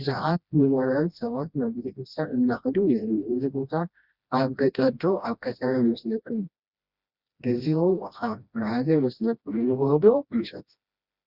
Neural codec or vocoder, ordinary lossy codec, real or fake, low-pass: codec, 16 kHz, 1 kbps, FreqCodec, smaller model; Opus, 64 kbps; fake; 5.4 kHz